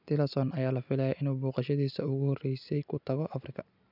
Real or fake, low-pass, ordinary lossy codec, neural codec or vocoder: real; 5.4 kHz; none; none